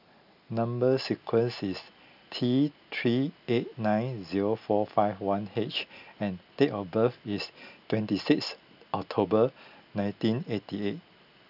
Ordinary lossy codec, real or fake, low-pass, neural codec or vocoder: none; real; 5.4 kHz; none